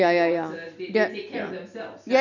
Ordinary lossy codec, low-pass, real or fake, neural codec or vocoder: none; 7.2 kHz; real; none